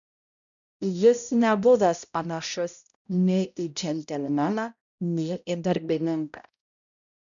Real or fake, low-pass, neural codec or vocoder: fake; 7.2 kHz; codec, 16 kHz, 0.5 kbps, X-Codec, HuBERT features, trained on balanced general audio